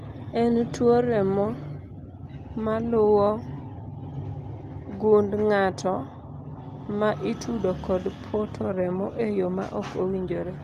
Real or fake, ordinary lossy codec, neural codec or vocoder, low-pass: real; Opus, 32 kbps; none; 14.4 kHz